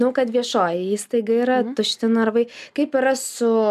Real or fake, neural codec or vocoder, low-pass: real; none; 14.4 kHz